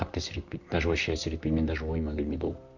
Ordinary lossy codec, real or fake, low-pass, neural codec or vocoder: none; fake; 7.2 kHz; vocoder, 44.1 kHz, 128 mel bands, Pupu-Vocoder